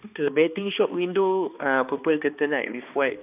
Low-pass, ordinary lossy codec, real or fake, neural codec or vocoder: 3.6 kHz; none; fake; codec, 16 kHz, 2 kbps, X-Codec, HuBERT features, trained on balanced general audio